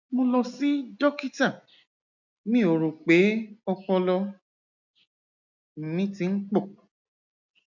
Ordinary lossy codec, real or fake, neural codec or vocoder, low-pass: none; real; none; 7.2 kHz